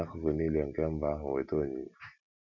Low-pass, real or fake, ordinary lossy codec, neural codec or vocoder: 7.2 kHz; real; none; none